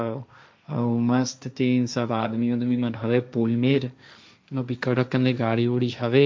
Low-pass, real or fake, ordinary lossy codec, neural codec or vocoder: none; fake; none; codec, 16 kHz, 1.1 kbps, Voila-Tokenizer